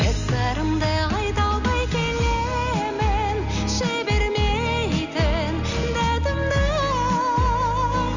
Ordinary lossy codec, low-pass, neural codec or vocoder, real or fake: none; 7.2 kHz; none; real